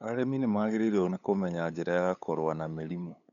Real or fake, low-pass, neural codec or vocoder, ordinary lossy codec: fake; 7.2 kHz; codec, 16 kHz, 8 kbps, FunCodec, trained on LibriTTS, 25 frames a second; none